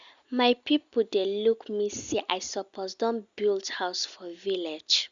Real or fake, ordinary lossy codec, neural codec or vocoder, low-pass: real; Opus, 64 kbps; none; 7.2 kHz